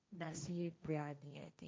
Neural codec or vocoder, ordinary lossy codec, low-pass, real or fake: codec, 16 kHz, 1.1 kbps, Voila-Tokenizer; none; none; fake